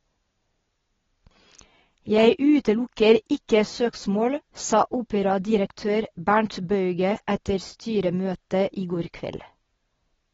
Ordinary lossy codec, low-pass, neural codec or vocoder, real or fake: AAC, 24 kbps; 7.2 kHz; none; real